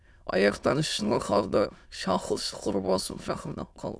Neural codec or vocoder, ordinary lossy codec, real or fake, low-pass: autoencoder, 22.05 kHz, a latent of 192 numbers a frame, VITS, trained on many speakers; none; fake; none